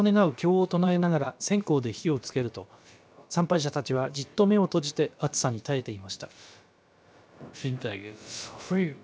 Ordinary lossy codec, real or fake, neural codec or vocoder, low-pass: none; fake; codec, 16 kHz, about 1 kbps, DyCAST, with the encoder's durations; none